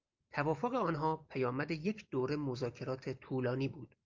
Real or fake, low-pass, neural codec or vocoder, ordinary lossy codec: real; 7.2 kHz; none; Opus, 24 kbps